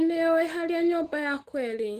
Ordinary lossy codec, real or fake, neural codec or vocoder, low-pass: Opus, 16 kbps; fake; autoencoder, 48 kHz, 128 numbers a frame, DAC-VAE, trained on Japanese speech; 14.4 kHz